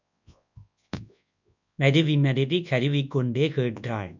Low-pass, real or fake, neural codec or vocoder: 7.2 kHz; fake; codec, 24 kHz, 0.9 kbps, WavTokenizer, large speech release